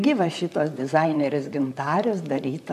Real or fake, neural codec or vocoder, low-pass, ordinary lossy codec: fake; vocoder, 44.1 kHz, 128 mel bands, Pupu-Vocoder; 14.4 kHz; AAC, 96 kbps